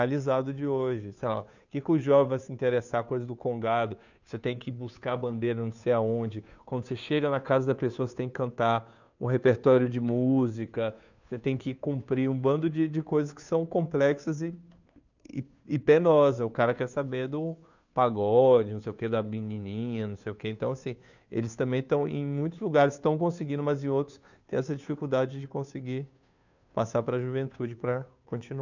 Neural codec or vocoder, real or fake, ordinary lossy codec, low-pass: codec, 16 kHz, 2 kbps, FunCodec, trained on Chinese and English, 25 frames a second; fake; none; 7.2 kHz